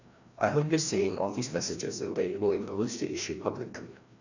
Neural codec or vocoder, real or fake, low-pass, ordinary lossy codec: codec, 16 kHz, 1 kbps, FreqCodec, larger model; fake; 7.2 kHz; none